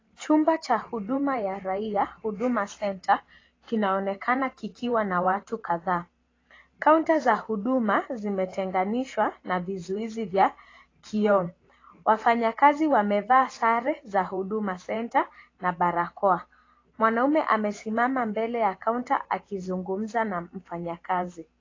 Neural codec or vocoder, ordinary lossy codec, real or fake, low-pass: vocoder, 44.1 kHz, 128 mel bands every 512 samples, BigVGAN v2; AAC, 32 kbps; fake; 7.2 kHz